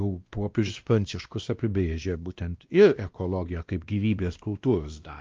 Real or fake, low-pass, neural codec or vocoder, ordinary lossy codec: fake; 7.2 kHz; codec, 16 kHz, 1 kbps, X-Codec, WavLM features, trained on Multilingual LibriSpeech; Opus, 32 kbps